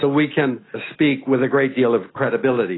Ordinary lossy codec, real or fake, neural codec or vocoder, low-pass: AAC, 16 kbps; real; none; 7.2 kHz